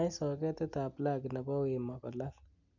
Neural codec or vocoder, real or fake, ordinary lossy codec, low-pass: none; real; none; 7.2 kHz